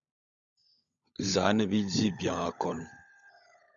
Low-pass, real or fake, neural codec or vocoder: 7.2 kHz; fake; codec, 16 kHz, 16 kbps, FunCodec, trained on LibriTTS, 50 frames a second